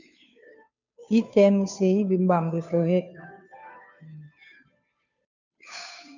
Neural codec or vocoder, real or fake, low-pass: codec, 16 kHz, 2 kbps, FunCodec, trained on Chinese and English, 25 frames a second; fake; 7.2 kHz